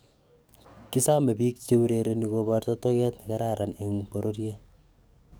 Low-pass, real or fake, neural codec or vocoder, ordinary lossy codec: none; fake; codec, 44.1 kHz, 7.8 kbps, DAC; none